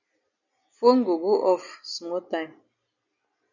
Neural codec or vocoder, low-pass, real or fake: none; 7.2 kHz; real